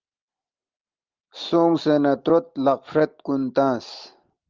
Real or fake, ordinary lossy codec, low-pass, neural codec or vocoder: real; Opus, 32 kbps; 7.2 kHz; none